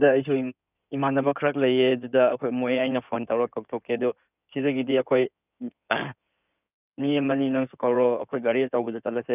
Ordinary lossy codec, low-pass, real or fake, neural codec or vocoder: none; 3.6 kHz; fake; codec, 16 kHz in and 24 kHz out, 2.2 kbps, FireRedTTS-2 codec